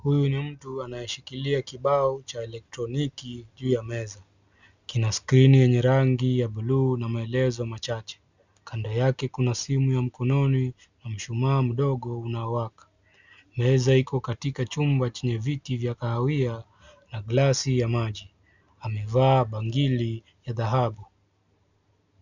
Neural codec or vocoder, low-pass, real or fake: none; 7.2 kHz; real